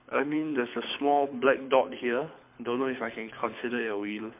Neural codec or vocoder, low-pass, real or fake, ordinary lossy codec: codec, 24 kHz, 6 kbps, HILCodec; 3.6 kHz; fake; MP3, 32 kbps